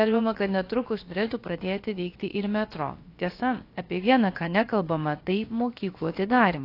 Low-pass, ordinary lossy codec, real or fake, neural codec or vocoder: 5.4 kHz; AAC, 32 kbps; fake; codec, 16 kHz, about 1 kbps, DyCAST, with the encoder's durations